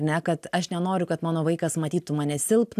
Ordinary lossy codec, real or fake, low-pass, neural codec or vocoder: AAC, 96 kbps; real; 14.4 kHz; none